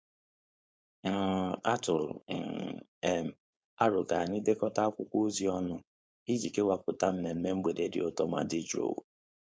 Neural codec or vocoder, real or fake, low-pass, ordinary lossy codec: codec, 16 kHz, 4.8 kbps, FACodec; fake; none; none